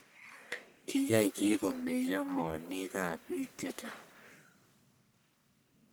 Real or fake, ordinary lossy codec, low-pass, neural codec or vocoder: fake; none; none; codec, 44.1 kHz, 1.7 kbps, Pupu-Codec